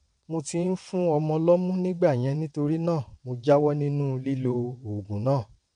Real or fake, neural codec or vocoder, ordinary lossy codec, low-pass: fake; vocoder, 22.05 kHz, 80 mel bands, WaveNeXt; MP3, 64 kbps; 9.9 kHz